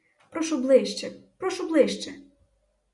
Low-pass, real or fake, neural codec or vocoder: 10.8 kHz; real; none